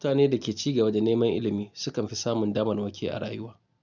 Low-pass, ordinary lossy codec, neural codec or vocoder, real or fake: 7.2 kHz; none; none; real